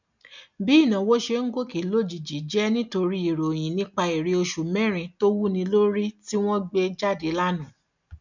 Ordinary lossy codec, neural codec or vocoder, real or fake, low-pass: none; none; real; 7.2 kHz